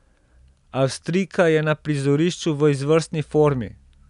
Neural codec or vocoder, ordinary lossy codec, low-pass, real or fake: none; none; 10.8 kHz; real